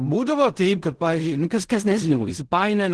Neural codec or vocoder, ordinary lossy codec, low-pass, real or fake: codec, 16 kHz in and 24 kHz out, 0.4 kbps, LongCat-Audio-Codec, fine tuned four codebook decoder; Opus, 16 kbps; 10.8 kHz; fake